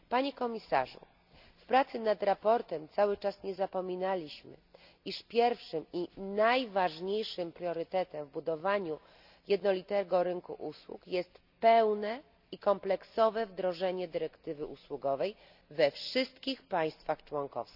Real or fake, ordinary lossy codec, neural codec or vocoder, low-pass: real; none; none; 5.4 kHz